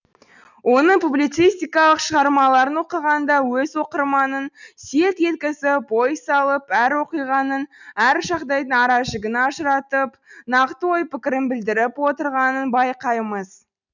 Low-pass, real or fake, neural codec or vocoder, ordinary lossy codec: 7.2 kHz; real; none; none